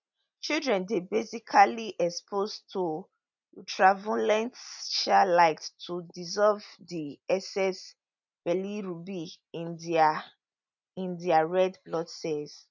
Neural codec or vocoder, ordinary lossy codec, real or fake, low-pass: none; none; real; 7.2 kHz